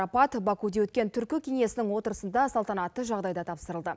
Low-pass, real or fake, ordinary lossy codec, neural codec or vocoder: none; real; none; none